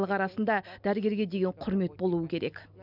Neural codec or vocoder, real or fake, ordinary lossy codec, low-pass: none; real; none; 5.4 kHz